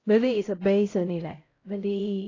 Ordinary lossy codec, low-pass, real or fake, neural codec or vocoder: AAC, 32 kbps; 7.2 kHz; fake; codec, 16 kHz, 0.5 kbps, X-Codec, HuBERT features, trained on LibriSpeech